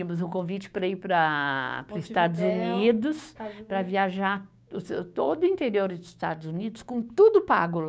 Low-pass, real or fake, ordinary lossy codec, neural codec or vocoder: none; fake; none; codec, 16 kHz, 6 kbps, DAC